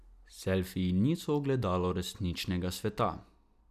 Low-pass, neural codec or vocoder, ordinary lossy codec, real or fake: 14.4 kHz; none; none; real